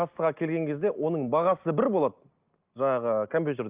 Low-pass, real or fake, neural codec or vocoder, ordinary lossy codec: 3.6 kHz; real; none; Opus, 24 kbps